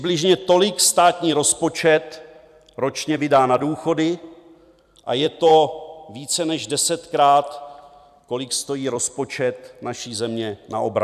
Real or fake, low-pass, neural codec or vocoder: fake; 14.4 kHz; vocoder, 44.1 kHz, 128 mel bands every 256 samples, BigVGAN v2